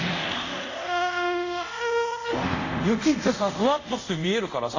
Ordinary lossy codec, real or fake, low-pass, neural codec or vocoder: AAC, 48 kbps; fake; 7.2 kHz; codec, 24 kHz, 0.5 kbps, DualCodec